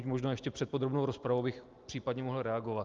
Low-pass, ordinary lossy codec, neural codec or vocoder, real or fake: 7.2 kHz; Opus, 32 kbps; none; real